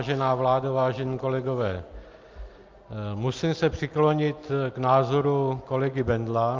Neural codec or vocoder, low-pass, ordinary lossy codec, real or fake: none; 7.2 kHz; Opus, 24 kbps; real